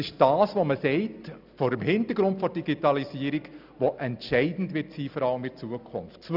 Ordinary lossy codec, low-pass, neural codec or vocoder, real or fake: none; 5.4 kHz; none; real